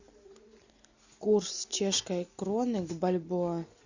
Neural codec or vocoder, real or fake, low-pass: none; real; 7.2 kHz